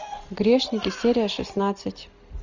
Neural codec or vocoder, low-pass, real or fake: none; 7.2 kHz; real